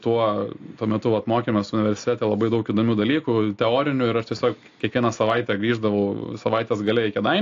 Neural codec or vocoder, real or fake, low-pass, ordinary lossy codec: none; real; 7.2 kHz; AAC, 48 kbps